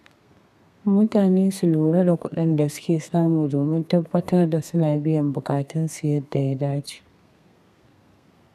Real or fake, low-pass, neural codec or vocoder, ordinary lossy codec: fake; 14.4 kHz; codec, 32 kHz, 1.9 kbps, SNAC; none